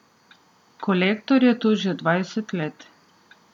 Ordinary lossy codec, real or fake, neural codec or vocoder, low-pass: none; real; none; 19.8 kHz